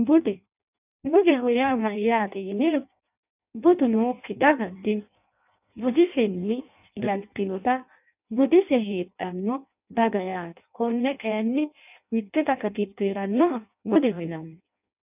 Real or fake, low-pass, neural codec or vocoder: fake; 3.6 kHz; codec, 16 kHz in and 24 kHz out, 0.6 kbps, FireRedTTS-2 codec